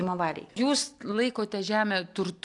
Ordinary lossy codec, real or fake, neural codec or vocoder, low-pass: AAC, 64 kbps; real; none; 10.8 kHz